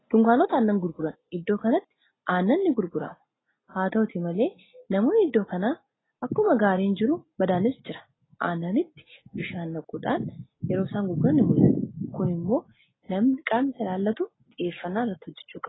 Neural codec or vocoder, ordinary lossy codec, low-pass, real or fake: none; AAC, 16 kbps; 7.2 kHz; real